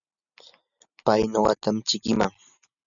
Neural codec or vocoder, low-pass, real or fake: none; 7.2 kHz; real